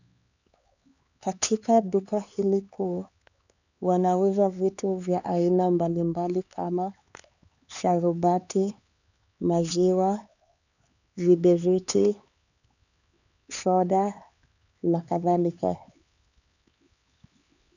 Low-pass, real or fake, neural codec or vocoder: 7.2 kHz; fake; codec, 16 kHz, 4 kbps, X-Codec, HuBERT features, trained on LibriSpeech